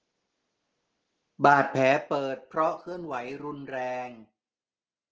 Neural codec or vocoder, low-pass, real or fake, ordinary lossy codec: none; 7.2 kHz; real; Opus, 16 kbps